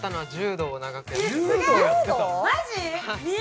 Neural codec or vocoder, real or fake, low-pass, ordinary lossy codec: none; real; none; none